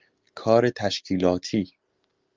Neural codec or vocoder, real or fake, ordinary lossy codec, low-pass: none; real; Opus, 32 kbps; 7.2 kHz